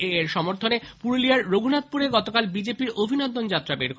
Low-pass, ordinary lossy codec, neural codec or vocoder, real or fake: none; none; none; real